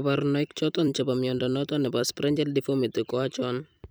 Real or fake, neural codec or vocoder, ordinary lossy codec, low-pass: fake; vocoder, 44.1 kHz, 128 mel bands every 256 samples, BigVGAN v2; none; none